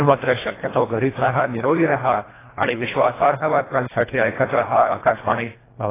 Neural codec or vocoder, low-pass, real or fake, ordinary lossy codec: codec, 24 kHz, 1.5 kbps, HILCodec; 3.6 kHz; fake; AAC, 16 kbps